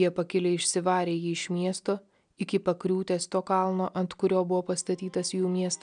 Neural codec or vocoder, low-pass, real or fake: none; 9.9 kHz; real